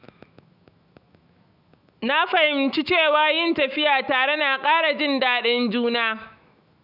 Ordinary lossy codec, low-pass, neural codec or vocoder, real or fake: none; 5.4 kHz; autoencoder, 48 kHz, 128 numbers a frame, DAC-VAE, trained on Japanese speech; fake